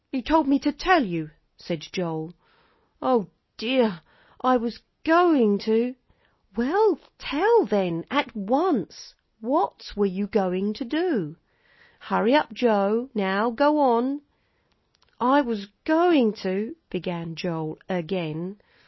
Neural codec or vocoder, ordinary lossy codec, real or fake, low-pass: none; MP3, 24 kbps; real; 7.2 kHz